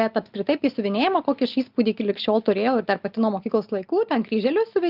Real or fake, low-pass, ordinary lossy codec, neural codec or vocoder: real; 5.4 kHz; Opus, 32 kbps; none